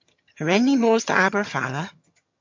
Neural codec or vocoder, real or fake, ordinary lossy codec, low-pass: vocoder, 22.05 kHz, 80 mel bands, HiFi-GAN; fake; MP3, 48 kbps; 7.2 kHz